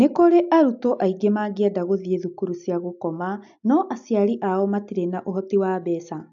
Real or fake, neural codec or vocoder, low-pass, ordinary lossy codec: real; none; 7.2 kHz; AAC, 64 kbps